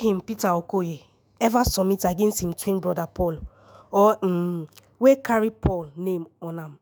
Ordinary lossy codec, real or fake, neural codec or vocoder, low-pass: none; fake; autoencoder, 48 kHz, 128 numbers a frame, DAC-VAE, trained on Japanese speech; none